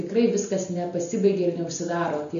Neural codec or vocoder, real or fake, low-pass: none; real; 7.2 kHz